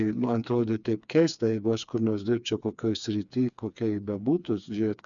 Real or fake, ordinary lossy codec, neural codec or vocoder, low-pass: fake; MP3, 96 kbps; codec, 16 kHz, 4 kbps, FreqCodec, smaller model; 7.2 kHz